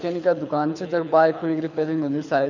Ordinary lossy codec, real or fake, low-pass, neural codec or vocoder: none; fake; 7.2 kHz; codec, 24 kHz, 6 kbps, HILCodec